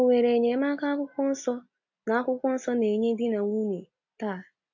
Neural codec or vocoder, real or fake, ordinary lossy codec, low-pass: none; real; AAC, 48 kbps; 7.2 kHz